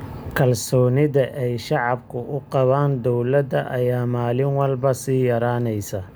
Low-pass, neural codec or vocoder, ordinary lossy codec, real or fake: none; none; none; real